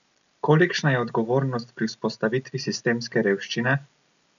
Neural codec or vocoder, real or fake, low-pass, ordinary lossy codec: none; real; 7.2 kHz; none